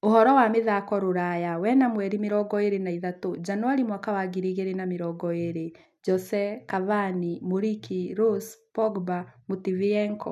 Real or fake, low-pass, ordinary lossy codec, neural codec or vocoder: real; 14.4 kHz; none; none